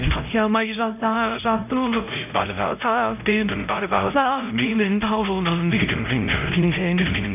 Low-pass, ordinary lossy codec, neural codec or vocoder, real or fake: 3.6 kHz; none; codec, 16 kHz, 0.5 kbps, X-Codec, HuBERT features, trained on LibriSpeech; fake